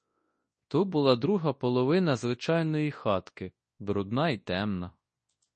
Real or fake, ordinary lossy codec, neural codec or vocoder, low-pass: fake; MP3, 32 kbps; codec, 24 kHz, 0.9 kbps, WavTokenizer, large speech release; 10.8 kHz